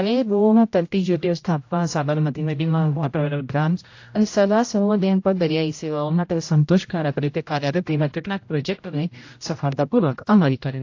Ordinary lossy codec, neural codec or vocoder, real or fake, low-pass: AAC, 48 kbps; codec, 16 kHz, 0.5 kbps, X-Codec, HuBERT features, trained on general audio; fake; 7.2 kHz